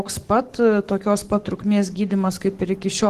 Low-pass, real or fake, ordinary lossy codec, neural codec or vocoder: 14.4 kHz; fake; Opus, 16 kbps; codec, 44.1 kHz, 7.8 kbps, Pupu-Codec